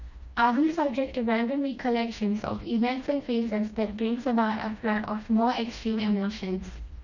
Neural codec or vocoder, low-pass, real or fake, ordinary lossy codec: codec, 16 kHz, 1 kbps, FreqCodec, smaller model; 7.2 kHz; fake; none